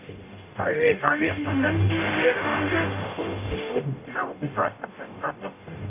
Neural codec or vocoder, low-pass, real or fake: codec, 44.1 kHz, 0.9 kbps, DAC; 3.6 kHz; fake